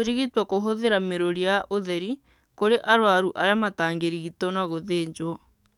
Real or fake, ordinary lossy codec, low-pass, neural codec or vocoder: fake; none; 19.8 kHz; codec, 44.1 kHz, 7.8 kbps, DAC